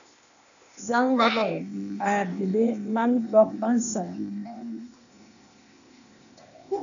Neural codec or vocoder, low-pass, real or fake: codec, 16 kHz, 0.8 kbps, ZipCodec; 7.2 kHz; fake